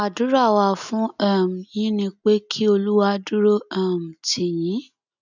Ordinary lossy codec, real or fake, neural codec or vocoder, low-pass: none; real; none; 7.2 kHz